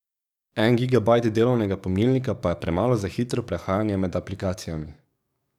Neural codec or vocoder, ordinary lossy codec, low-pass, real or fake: codec, 44.1 kHz, 7.8 kbps, DAC; none; 19.8 kHz; fake